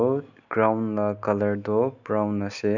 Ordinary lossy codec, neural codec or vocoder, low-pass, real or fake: none; none; 7.2 kHz; real